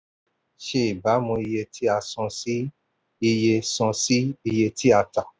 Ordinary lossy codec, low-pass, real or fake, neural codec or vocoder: none; none; real; none